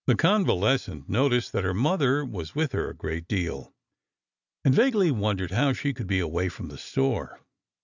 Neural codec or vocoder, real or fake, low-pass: none; real; 7.2 kHz